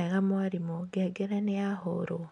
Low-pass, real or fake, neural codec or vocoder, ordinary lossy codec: 9.9 kHz; real; none; none